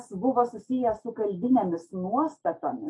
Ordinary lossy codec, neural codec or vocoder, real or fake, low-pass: AAC, 48 kbps; none; real; 10.8 kHz